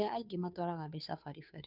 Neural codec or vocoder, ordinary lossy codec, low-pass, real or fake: codec, 16 kHz, 2 kbps, FunCodec, trained on Chinese and English, 25 frames a second; none; 5.4 kHz; fake